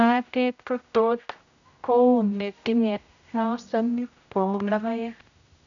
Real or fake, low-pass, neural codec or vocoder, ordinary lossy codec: fake; 7.2 kHz; codec, 16 kHz, 0.5 kbps, X-Codec, HuBERT features, trained on general audio; none